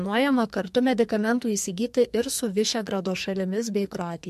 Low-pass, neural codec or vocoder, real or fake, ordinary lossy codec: 14.4 kHz; codec, 44.1 kHz, 2.6 kbps, SNAC; fake; MP3, 64 kbps